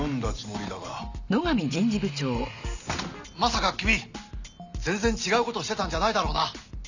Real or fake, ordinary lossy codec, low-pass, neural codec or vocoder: real; none; 7.2 kHz; none